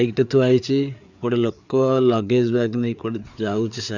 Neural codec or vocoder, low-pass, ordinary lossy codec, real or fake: codec, 16 kHz, 4 kbps, FreqCodec, larger model; 7.2 kHz; none; fake